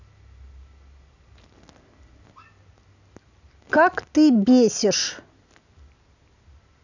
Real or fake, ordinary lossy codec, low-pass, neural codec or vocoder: real; none; 7.2 kHz; none